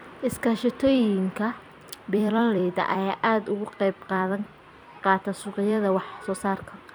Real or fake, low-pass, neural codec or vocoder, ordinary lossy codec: fake; none; vocoder, 44.1 kHz, 128 mel bands every 256 samples, BigVGAN v2; none